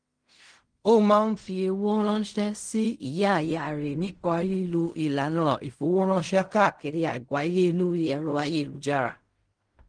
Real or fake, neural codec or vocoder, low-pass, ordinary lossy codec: fake; codec, 16 kHz in and 24 kHz out, 0.4 kbps, LongCat-Audio-Codec, fine tuned four codebook decoder; 9.9 kHz; Opus, 24 kbps